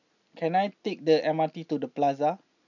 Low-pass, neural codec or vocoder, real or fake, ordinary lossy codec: 7.2 kHz; none; real; none